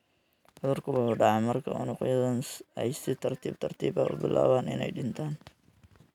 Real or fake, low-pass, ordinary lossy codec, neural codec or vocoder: real; 19.8 kHz; none; none